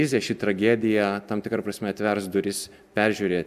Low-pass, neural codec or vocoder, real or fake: 14.4 kHz; none; real